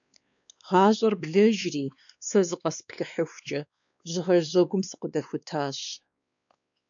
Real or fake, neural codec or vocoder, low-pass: fake; codec, 16 kHz, 2 kbps, X-Codec, WavLM features, trained on Multilingual LibriSpeech; 7.2 kHz